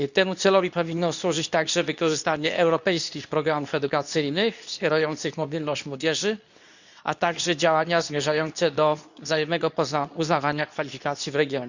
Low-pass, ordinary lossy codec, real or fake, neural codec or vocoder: 7.2 kHz; none; fake; codec, 24 kHz, 0.9 kbps, WavTokenizer, medium speech release version 2